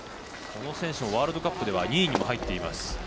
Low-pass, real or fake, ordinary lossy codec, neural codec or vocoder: none; real; none; none